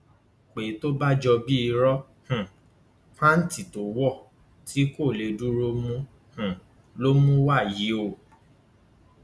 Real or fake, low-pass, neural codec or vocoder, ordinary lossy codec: real; none; none; none